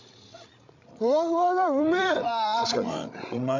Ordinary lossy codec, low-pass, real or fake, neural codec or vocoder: none; 7.2 kHz; fake; codec, 16 kHz, 16 kbps, FreqCodec, larger model